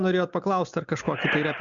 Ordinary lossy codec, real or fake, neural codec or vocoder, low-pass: MP3, 64 kbps; real; none; 7.2 kHz